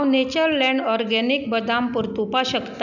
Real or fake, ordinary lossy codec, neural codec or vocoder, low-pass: real; none; none; 7.2 kHz